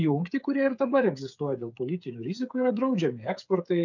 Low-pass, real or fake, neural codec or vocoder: 7.2 kHz; fake; codec, 16 kHz, 8 kbps, FreqCodec, smaller model